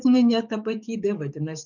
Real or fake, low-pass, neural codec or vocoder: fake; 7.2 kHz; codec, 44.1 kHz, 7.8 kbps, DAC